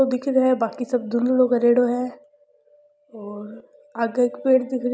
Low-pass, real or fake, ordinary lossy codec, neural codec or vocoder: none; real; none; none